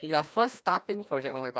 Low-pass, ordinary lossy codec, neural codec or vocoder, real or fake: none; none; codec, 16 kHz, 1 kbps, FreqCodec, larger model; fake